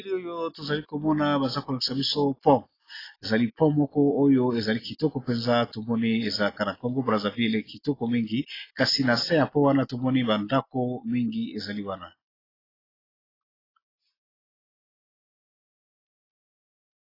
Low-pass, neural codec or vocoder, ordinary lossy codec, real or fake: 5.4 kHz; none; AAC, 24 kbps; real